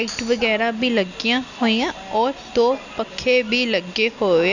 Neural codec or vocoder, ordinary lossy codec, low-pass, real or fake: none; none; 7.2 kHz; real